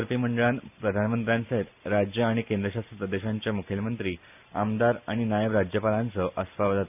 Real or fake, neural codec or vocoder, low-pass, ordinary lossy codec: real; none; 3.6 kHz; none